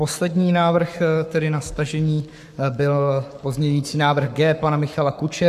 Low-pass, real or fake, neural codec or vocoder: 14.4 kHz; fake; codec, 44.1 kHz, 7.8 kbps, Pupu-Codec